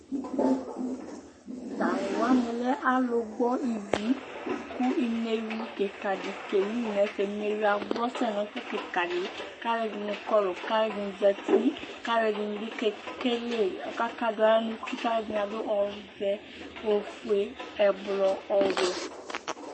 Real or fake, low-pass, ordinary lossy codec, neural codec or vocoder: fake; 9.9 kHz; MP3, 32 kbps; codec, 44.1 kHz, 7.8 kbps, Pupu-Codec